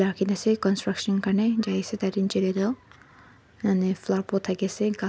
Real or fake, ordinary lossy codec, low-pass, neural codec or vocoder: real; none; none; none